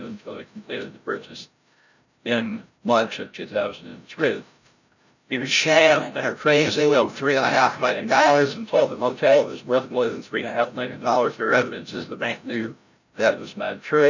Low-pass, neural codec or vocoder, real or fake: 7.2 kHz; codec, 16 kHz, 0.5 kbps, FreqCodec, larger model; fake